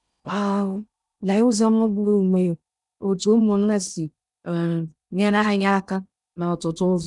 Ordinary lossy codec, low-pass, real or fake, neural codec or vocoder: none; 10.8 kHz; fake; codec, 16 kHz in and 24 kHz out, 0.6 kbps, FocalCodec, streaming, 2048 codes